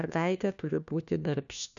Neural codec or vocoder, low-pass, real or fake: codec, 16 kHz, 1 kbps, FunCodec, trained on LibriTTS, 50 frames a second; 7.2 kHz; fake